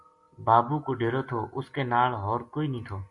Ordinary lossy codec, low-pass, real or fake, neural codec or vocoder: MP3, 48 kbps; 10.8 kHz; real; none